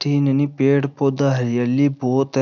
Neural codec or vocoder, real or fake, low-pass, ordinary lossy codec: none; real; 7.2 kHz; none